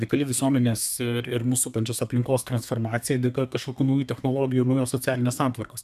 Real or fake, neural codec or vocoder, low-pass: fake; codec, 32 kHz, 1.9 kbps, SNAC; 14.4 kHz